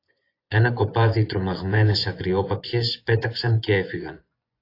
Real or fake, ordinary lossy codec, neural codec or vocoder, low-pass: fake; AAC, 32 kbps; vocoder, 24 kHz, 100 mel bands, Vocos; 5.4 kHz